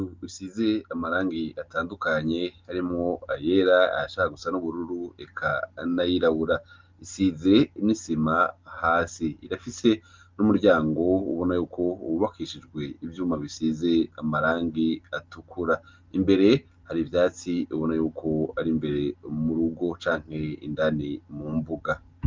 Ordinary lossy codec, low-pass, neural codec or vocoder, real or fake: Opus, 32 kbps; 7.2 kHz; none; real